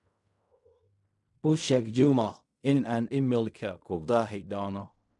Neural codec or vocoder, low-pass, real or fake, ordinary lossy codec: codec, 16 kHz in and 24 kHz out, 0.4 kbps, LongCat-Audio-Codec, fine tuned four codebook decoder; 10.8 kHz; fake; none